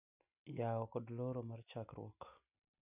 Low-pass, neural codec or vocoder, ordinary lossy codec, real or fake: 3.6 kHz; none; none; real